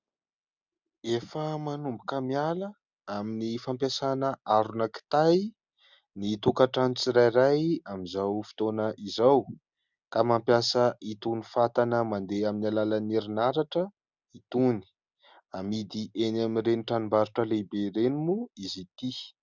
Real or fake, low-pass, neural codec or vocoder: real; 7.2 kHz; none